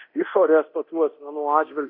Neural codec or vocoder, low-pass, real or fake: codec, 24 kHz, 0.9 kbps, DualCodec; 5.4 kHz; fake